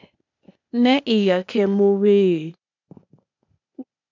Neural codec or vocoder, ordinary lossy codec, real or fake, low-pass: codec, 16 kHz, 0.8 kbps, ZipCodec; MP3, 64 kbps; fake; 7.2 kHz